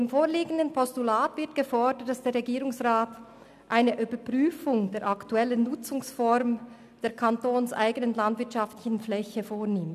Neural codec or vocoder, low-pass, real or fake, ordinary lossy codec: none; 14.4 kHz; real; none